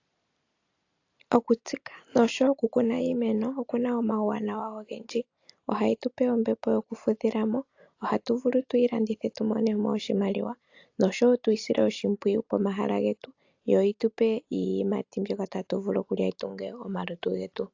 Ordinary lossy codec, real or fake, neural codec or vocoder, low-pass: AAC, 48 kbps; real; none; 7.2 kHz